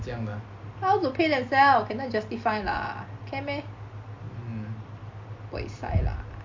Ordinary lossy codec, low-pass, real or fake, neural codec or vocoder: MP3, 48 kbps; 7.2 kHz; real; none